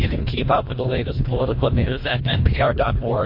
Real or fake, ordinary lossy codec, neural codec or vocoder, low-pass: fake; MP3, 32 kbps; codec, 24 kHz, 1.5 kbps, HILCodec; 5.4 kHz